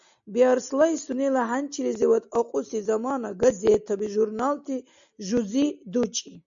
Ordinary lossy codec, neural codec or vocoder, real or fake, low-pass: MP3, 64 kbps; none; real; 7.2 kHz